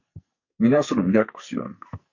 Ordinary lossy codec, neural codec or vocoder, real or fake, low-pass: MP3, 48 kbps; codec, 44.1 kHz, 2.6 kbps, SNAC; fake; 7.2 kHz